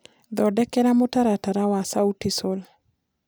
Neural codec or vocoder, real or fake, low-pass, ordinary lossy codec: none; real; none; none